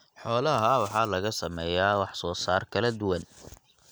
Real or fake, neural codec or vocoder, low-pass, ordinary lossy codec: real; none; none; none